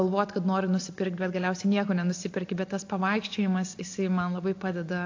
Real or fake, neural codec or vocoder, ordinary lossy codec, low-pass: real; none; AAC, 48 kbps; 7.2 kHz